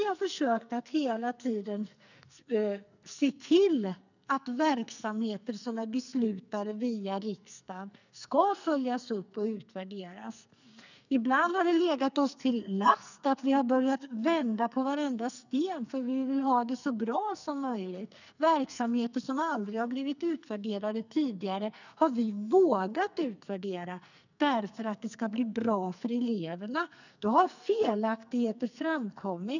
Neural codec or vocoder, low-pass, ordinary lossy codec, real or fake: codec, 44.1 kHz, 2.6 kbps, SNAC; 7.2 kHz; none; fake